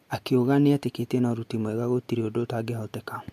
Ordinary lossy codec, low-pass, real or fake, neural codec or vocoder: MP3, 64 kbps; 14.4 kHz; real; none